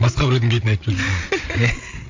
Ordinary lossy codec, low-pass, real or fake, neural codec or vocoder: MP3, 48 kbps; 7.2 kHz; fake; codec, 16 kHz, 8 kbps, FreqCodec, larger model